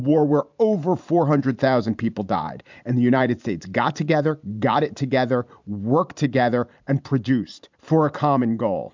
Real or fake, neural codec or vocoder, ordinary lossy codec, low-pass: fake; vocoder, 44.1 kHz, 128 mel bands every 512 samples, BigVGAN v2; MP3, 64 kbps; 7.2 kHz